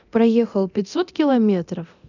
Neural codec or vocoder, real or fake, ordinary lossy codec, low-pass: codec, 24 kHz, 0.9 kbps, DualCodec; fake; none; 7.2 kHz